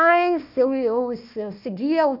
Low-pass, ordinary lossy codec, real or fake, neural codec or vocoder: 5.4 kHz; none; fake; codec, 16 kHz, 1 kbps, FunCodec, trained on Chinese and English, 50 frames a second